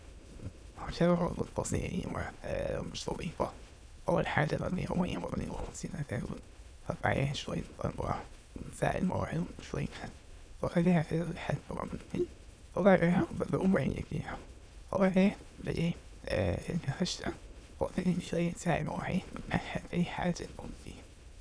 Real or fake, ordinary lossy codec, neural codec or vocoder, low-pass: fake; none; autoencoder, 22.05 kHz, a latent of 192 numbers a frame, VITS, trained on many speakers; none